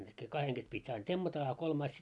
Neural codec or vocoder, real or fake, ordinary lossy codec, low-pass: none; real; none; 10.8 kHz